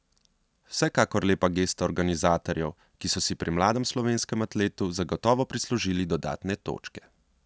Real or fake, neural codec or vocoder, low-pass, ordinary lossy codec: real; none; none; none